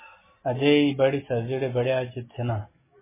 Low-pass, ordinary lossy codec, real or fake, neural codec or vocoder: 3.6 kHz; MP3, 16 kbps; real; none